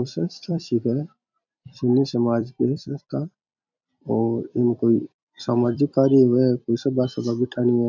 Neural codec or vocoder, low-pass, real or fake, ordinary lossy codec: vocoder, 44.1 kHz, 128 mel bands every 256 samples, BigVGAN v2; 7.2 kHz; fake; none